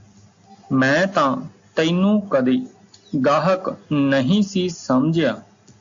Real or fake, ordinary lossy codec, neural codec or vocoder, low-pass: real; AAC, 64 kbps; none; 7.2 kHz